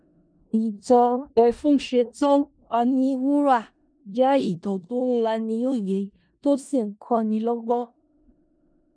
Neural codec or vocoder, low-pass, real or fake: codec, 16 kHz in and 24 kHz out, 0.4 kbps, LongCat-Audio-Codec, four codebook decoder; 9.9 kHz; fake